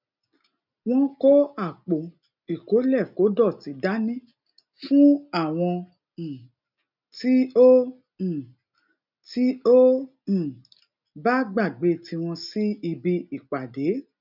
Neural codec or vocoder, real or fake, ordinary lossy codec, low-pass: none; real; none; 5.4 kHz